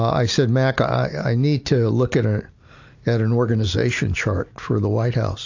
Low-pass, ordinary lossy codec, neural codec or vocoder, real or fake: 7.2 kHz; AAC, 48 kbps; none; real